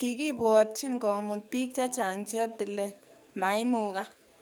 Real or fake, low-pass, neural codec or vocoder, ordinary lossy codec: fake; none; codec, 44.1 kHz, 2.6 kbps, SNAC; none